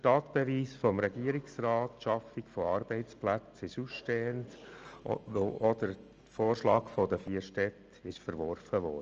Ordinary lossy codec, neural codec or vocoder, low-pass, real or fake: Opus, 24 kbps; none; 7.2 kHz; real